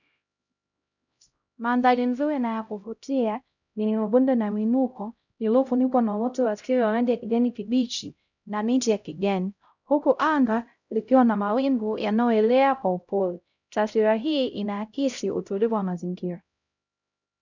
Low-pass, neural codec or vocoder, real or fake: 7.2 kHz; codec, 16 kHz, 0.5 kbps, X-Codec, HuBERT features, trained on LibriSpeech; fake